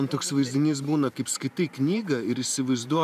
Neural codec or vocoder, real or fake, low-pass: vocoder, 44.1 kHz, 128 mel bands every 512 samples, BigVGAN v2; fake; 14.4 kHz